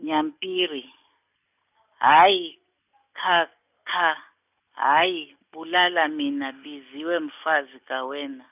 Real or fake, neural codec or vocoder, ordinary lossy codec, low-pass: real; none; none; 3.6 kHz